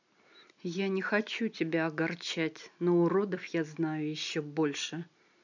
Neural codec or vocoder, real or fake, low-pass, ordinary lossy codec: none; real; 7.2 kHz; none